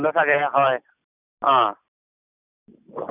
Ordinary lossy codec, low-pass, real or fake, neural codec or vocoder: none; 3.6 kHz; real; none